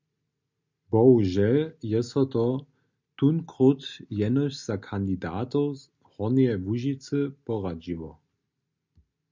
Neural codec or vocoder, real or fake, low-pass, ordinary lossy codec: none; real; 7.2 kHz; MP3, 64 kbps